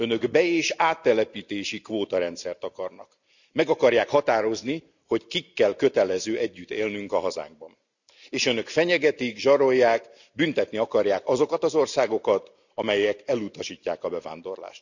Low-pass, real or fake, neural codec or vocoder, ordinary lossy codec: 7.2 kHz; real; none; none